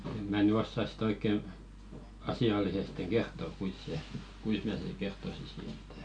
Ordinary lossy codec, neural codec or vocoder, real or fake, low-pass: none; none; real; 9.9 kHz